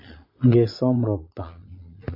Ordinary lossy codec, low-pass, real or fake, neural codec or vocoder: MP3, 32 kbps; 5.4 kHz; fake; vocoder, 22.05 kHz, 80 mel bands, Vocos